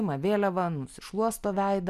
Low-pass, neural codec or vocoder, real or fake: 14.4 kHz; none; real